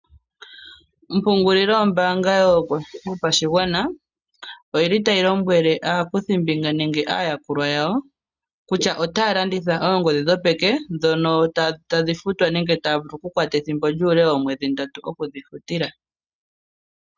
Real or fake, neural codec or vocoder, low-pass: real; none; 7.2 kHz